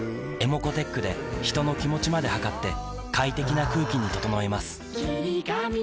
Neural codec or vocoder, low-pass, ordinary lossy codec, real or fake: none; none; none; real